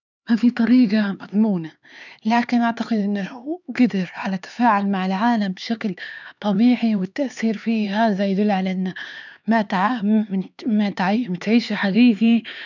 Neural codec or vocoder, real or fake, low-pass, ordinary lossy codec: codec, 16 kHz, 4 kbps, X-Codec, HuBERT features, trained on LibriSpeech; fake; 7.2 kHz; none